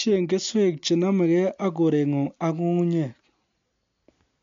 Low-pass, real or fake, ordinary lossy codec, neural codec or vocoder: 7.2 kHz; real; MP3, 64 kbps; none